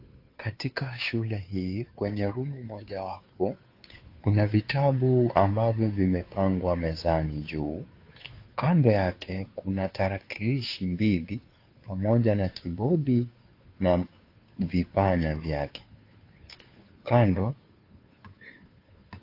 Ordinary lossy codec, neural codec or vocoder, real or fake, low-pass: AAC, 32 kbps; codec, 16 kHz, 2 kbps, FunCodec, trained on Chinese and English, 25 frames a second; fake; 5.4 kHz